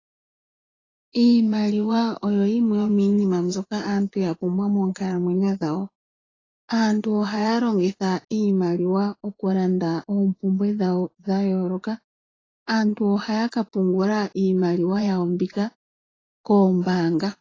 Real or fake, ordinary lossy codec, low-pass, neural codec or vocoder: fake; AAC, 32 kbps; 7.2 kHz; vocoder, 24 kHz, 100 mel bands, Vocos